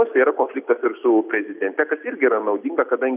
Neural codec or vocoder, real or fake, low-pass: none; real; 3.6 kHz